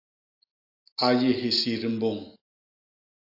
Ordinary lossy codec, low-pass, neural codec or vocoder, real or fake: AAC, 32 kbps; 5.4 kHz; none; real